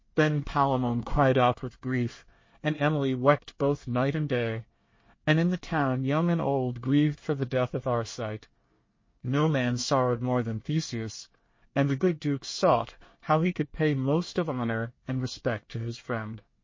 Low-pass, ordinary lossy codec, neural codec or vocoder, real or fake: 7.2 kHz; MP3, 32 kbps; codec, 24 kHz, 1 kbps, SNAC; fake